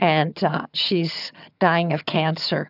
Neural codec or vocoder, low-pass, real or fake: vocoder, 22.05 kHz, 80 mel bands, HiFi-GAN; 5.4 kHz; fake